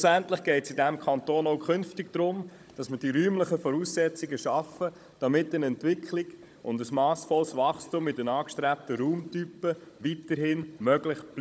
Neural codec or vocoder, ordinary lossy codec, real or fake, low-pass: codec, 16 kHz, 16 kbps, FunCodec, trained on Chinese and English, 50 frames a second; none; fake; none